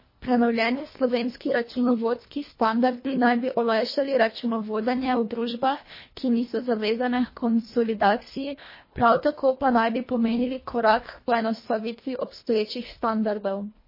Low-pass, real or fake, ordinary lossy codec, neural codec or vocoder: 5.4 kHz; fake; MP3, 24 kbps; codec, 24 kHz, 1.5 kbps, HILCodec